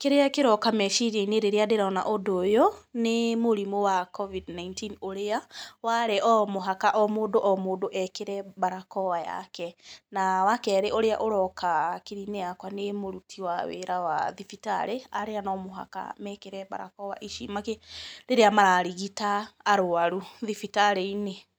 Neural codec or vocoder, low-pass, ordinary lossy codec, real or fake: none; none; none; real